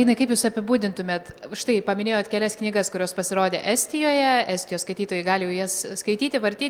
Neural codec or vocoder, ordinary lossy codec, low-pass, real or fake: none; Opus, 32 kbps; 19.8 kHz; real